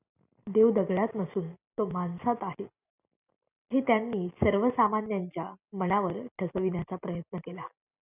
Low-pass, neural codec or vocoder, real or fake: 3.6 kHz; none; real